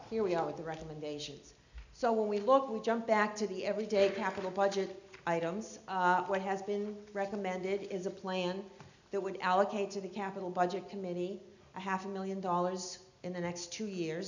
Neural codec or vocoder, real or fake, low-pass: none; real; 7.2 kHz